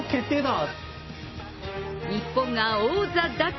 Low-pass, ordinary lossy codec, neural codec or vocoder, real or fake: 7.2 kHz; MP3, 24 kbps; none; real